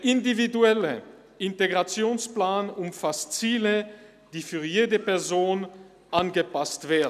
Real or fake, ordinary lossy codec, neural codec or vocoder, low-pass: real; none; none; 14.4 kHz